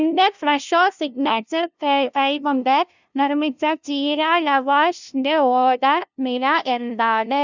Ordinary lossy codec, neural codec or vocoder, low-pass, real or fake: none; codec, 16 kHz, 0.5 kbps, FunCodec, trained on LibriTTS, 25 frames a second; 7.2 kHz; fake